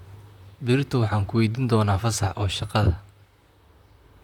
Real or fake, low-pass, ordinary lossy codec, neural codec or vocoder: fake; 19.8 kHz; none; vocoder, 44.1 kHz, 128 mel bands, Pupu-Vocoder